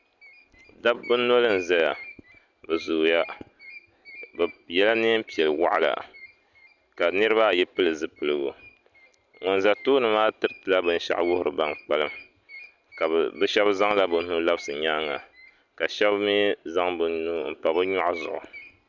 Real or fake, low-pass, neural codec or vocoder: real; 7.2 kHz; none